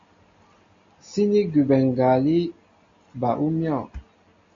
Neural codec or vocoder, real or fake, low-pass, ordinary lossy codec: none; real; 7.2 kHz; MP3, 48 kbps